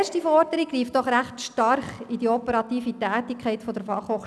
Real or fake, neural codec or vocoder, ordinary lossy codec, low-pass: real; none; none; none